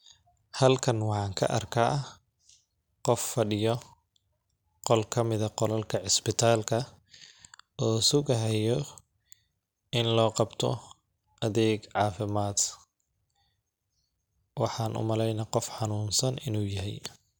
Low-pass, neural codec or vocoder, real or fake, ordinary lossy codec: none; none; real; none